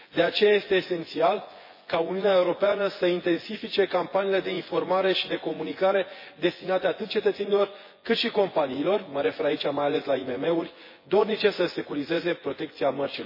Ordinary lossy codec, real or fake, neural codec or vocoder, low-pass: MP3, 24 kbps; fake; vocoder, 24 kHz, 100 mel bands, Vocos; 5.4 kHz